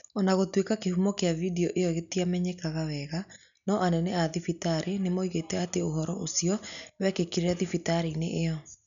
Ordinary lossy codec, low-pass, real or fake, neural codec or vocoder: none; 7.2 kHz; real; none